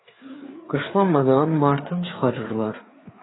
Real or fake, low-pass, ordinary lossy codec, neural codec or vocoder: fake; 7.2 kHz; AAC, 16 kbps; codec, 16 kHz, 4 kbps, FreqCodec, larger model